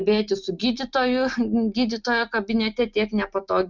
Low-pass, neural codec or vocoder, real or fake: 7.2 kHz; none; real